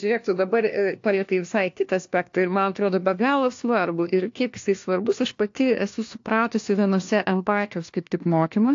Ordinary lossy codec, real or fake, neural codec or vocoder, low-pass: AAC, 48 kbps; fake; codec, 16 kHz, 1 kbps, FunCodec, trained on LibriTTS, 50 frames a second; 7.2 kHz